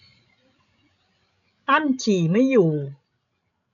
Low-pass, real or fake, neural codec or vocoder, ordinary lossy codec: 7.2 kHz; fake; codec, 16 kHz, 16 kbps, FreqCodec, larger model; none